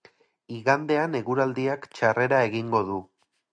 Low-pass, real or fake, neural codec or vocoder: 9.9 kHz; real; none